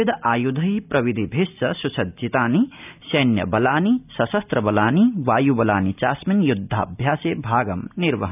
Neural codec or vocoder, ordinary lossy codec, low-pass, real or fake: none; none; 3.6 kHz; real